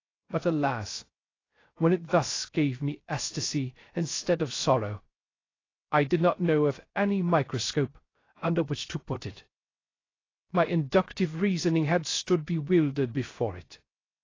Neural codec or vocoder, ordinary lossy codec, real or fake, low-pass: codec, 16 kHz, 0.3 kbps, FocalCodec; AAC, 32 kbps; fake; 7.2 kHz